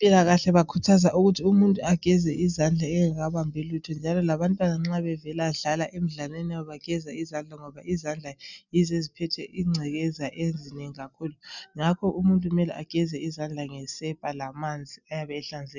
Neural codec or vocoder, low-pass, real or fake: none; 7.2 kHz; real